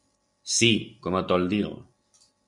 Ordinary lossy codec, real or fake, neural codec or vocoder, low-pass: MP3, 96 kbps; real; none; 10.8 kHz